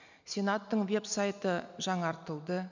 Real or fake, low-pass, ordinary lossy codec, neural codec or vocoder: real; 7.2 kHz; none; none